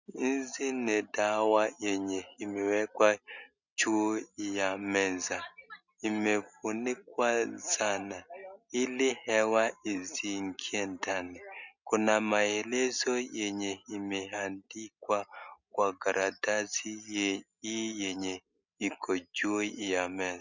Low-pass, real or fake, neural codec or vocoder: 7.2 kHz; real; none